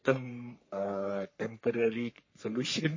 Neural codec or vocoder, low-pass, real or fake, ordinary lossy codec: codec, 44.1 kHz, 3.4 kbps, Pupu-Codec; 7.2 kHz; fake; MP3, 32 kbps